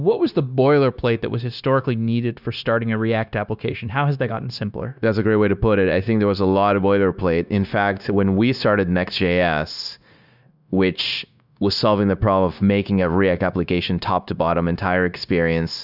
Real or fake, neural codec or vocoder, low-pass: fake; codec, 16 kHz, 0.9 kbps, LongCat-Audio-Codec; 5.4 kHz